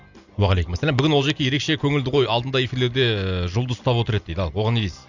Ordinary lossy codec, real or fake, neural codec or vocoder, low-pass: none; real; none; 7.2 kHz